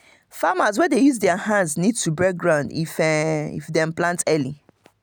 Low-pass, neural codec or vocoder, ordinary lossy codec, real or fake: none; none; none; real